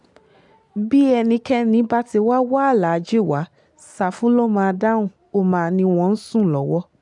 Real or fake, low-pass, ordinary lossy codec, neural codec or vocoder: real; 10.8 kHz; none; none